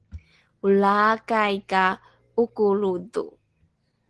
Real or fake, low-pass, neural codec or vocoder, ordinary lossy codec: real; 10.8 kHz; none; Opus, 16 kbps